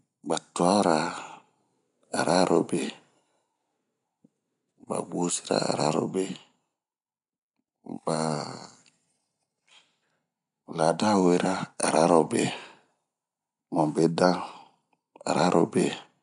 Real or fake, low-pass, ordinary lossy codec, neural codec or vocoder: real; 10.8 kHz; none; none